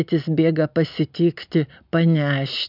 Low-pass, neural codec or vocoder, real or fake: 5.4 kHz; none; real